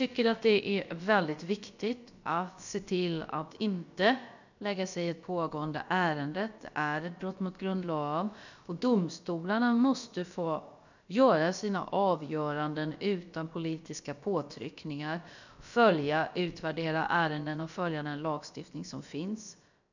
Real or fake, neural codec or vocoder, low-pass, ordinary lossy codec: fake; codec, 16 kHz, about 1 kbps, DyCAST, with the encoder's durations; 7.2 kHz; none